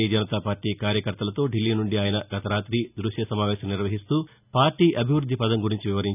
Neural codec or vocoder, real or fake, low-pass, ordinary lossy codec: none; real; 3.6 kHz; none